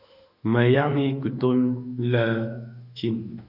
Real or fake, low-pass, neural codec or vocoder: fake; 5.4 kHz; autoencoder, 48 kHz, 32 numbers a frame, DAC-VAE, trained on Japanese speech